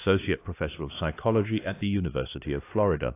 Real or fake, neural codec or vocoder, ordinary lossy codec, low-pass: fake; codec, 16 kHz, 2 kbps, X-Codec, HuBERT features, trained on LibriSpeech; AAC, 24 kbps; 3.6 kHz